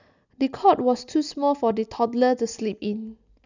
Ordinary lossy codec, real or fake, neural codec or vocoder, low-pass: none; real; none; 7.2 kHz